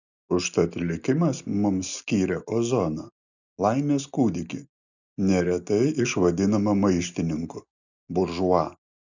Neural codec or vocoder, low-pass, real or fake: none; 7.2 kHz; real